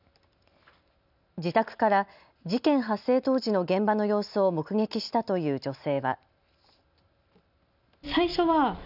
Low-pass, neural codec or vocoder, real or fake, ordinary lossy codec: 5.4 kHz; none; real; none